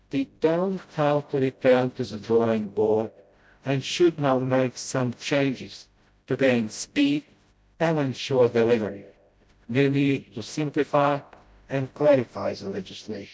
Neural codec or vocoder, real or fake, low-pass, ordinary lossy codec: codec, 16 kHz, 0.5 kbps, FreqCodec, smaller model; fake; none; none